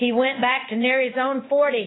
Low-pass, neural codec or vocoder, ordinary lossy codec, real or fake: 7.2 kHz; codec, 16 kHz in and 24 kHz out, 1 kbps, XY-Tokenizer; AAC, 16 kbps; fake